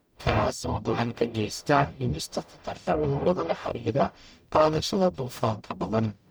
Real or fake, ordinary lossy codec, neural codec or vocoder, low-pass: fake; none; codec, 44.1 kHz, 0.9 kbps, DAC; none